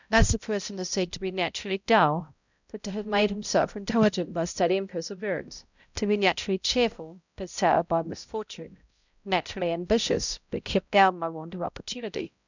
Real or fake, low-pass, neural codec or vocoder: fake; 7.2 kHz; codec, 16 kHz, 0.5 kbps, X-Codec, HuBERT features, trained on balanced general audio